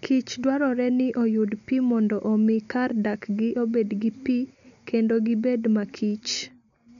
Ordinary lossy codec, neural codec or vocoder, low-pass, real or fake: none; none; 7.2 kHz; real